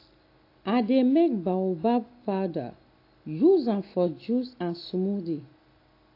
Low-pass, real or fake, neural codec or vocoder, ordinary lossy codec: 5.4 kHz; real; none; AAC, 32 kbps